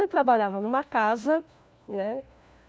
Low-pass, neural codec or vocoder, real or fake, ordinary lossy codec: none; codec, 16 kHz, 1 kbps, FunCodec, trained on LibriTTS, 50 frames a second; fake; none